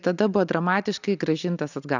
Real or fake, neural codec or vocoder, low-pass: real; none; 7.2 kHz